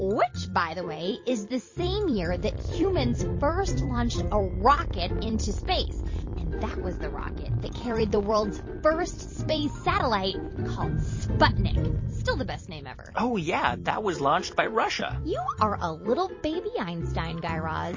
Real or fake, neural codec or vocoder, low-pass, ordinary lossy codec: fake; vocoder, 44.1 kHz, 128 mel bands every 256 samples, BigVGAN v2; 7.2 kHz; MP3, 32 kbps